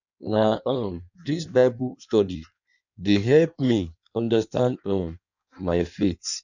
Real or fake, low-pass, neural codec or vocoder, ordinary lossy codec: fake; 7.2 kHz; codec, 16 kHz in and 24 kHz out, 1.1 kbps, FireRedTTS-2 codec; none